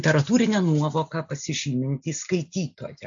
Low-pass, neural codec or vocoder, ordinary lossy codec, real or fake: 7.2 kHz; none; AAC, 64 kbps; real